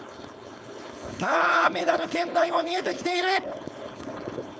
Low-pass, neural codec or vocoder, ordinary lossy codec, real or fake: none; codec, 16 kHz, 4.8 kbps, FACodec; none; fake